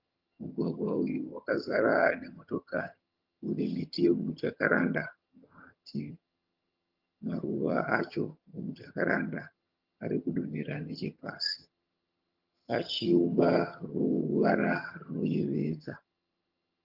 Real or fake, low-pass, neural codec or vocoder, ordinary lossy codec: fake; 5.4 kHz; vocoder, 22.05 kHz, 80 mel bands, HiFi-GAN; Opus, 24 kbps